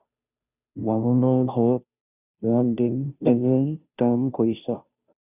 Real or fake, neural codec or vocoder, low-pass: fake; codec, 16 kHz, 0.5 kbps, FunCodec, trained on Chinese and English, 25 frames a second; 3.6 kHz